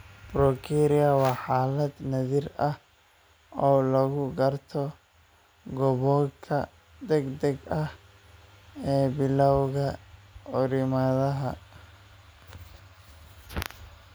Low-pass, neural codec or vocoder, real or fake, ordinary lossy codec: none; none; real; none